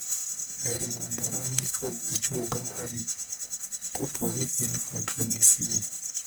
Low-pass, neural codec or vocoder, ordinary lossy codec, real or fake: none; codec, 44.1 kHz, 1.7 kbps, Pupu-Codec; none; fake